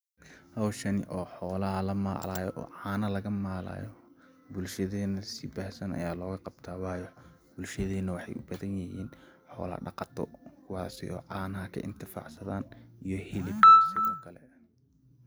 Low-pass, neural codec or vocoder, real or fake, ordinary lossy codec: none; none; real; none